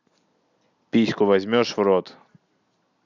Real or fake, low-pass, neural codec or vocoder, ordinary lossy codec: real; 7.2 kHz; none; none